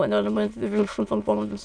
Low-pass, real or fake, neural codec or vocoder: 9.9 kHz; fake; autoencoder, 22.05 kHz, a latent of 192 numbers a frame, VITS, trained on many speakers